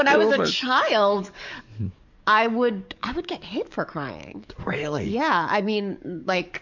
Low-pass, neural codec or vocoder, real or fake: 7.2 kHz; codec, 44.1 kHz, 7.8 kbps, DAC; fake